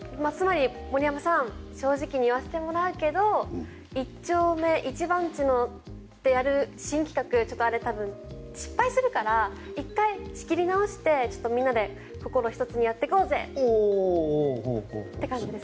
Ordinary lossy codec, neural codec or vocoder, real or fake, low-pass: none; none; real; none